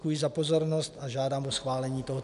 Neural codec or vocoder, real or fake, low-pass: none; real; 10.8 kHz